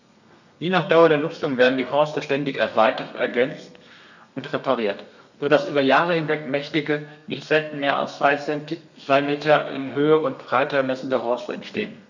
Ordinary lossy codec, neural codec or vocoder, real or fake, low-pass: none; codec, 32 kHz, 1.9 kbps, SNAC; fake; 7.2 kHz